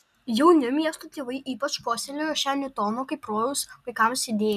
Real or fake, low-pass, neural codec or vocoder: real; 14.4 kHz; none